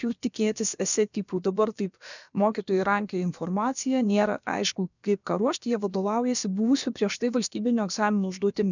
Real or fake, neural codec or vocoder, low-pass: fake; codec, 16 kHz, about 1 kbps, DyCAST, with the encoder's durations; 7.2 kHz